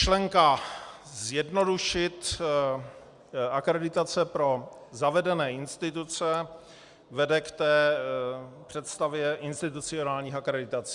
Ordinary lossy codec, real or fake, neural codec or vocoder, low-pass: Opus, 64 kbps; real; none; 10.8 kHz